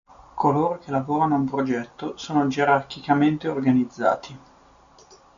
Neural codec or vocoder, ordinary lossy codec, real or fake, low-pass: none; AAC, 96 kbps; real; 7.2 kHz